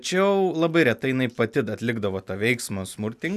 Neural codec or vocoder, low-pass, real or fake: none; 14.4 kHz; real